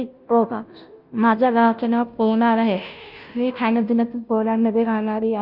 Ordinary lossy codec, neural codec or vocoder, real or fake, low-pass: Opus, 24 kbps; codec, 16 kHz, 0.5 kbps, FunCodec, trained on Chinese and English, 25 frames a second; fake; 5.4 kHz